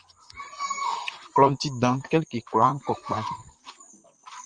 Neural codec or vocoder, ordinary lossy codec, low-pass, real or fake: vocoder, 44.1 kHz, 128 mel bands, Pupu-Vocoder; Opus, 32 kbps; 9.9 kHz; fake